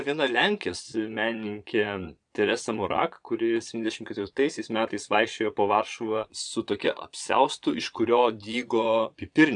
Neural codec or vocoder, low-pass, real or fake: vocoder, 22.05 kHz, 80 mel bands, Vocos; 9.9 kHz; fake